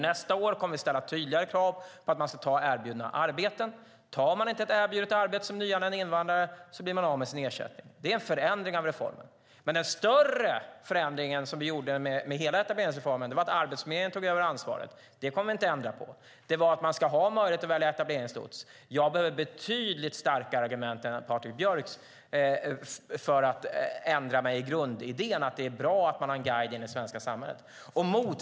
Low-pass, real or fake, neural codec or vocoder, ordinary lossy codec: none; real; none; none